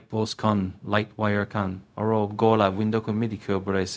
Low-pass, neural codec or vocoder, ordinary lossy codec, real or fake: none; codec, 16 kHz, 0.4 kbps, LongCat-Audio-Codec; none; fake